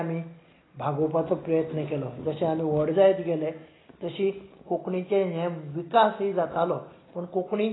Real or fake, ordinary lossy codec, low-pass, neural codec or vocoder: real; AAC, 16 kbps; 7.2 kHz; none